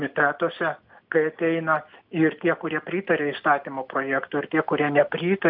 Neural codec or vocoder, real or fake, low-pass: none; real; 5.4 kHz